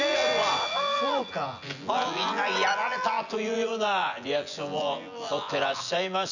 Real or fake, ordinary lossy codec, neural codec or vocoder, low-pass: fake; none; vocoder, 24 kHz, 100 mel bands, Vocos; 7.2 kHz